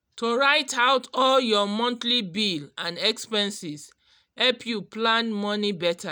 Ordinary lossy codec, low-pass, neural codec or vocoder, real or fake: none; none; none; real